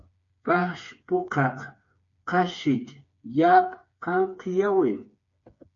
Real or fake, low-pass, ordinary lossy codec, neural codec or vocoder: fake; 7.2 kHz; MP3, 48 kbps; codec, 16 kHz, 4 kbps, FreqCodec, smaller model